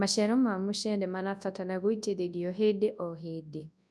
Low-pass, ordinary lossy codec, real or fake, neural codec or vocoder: none; none; fake; codec, 24 kHz, 0.9 kbps, WavTokenizer, large speech release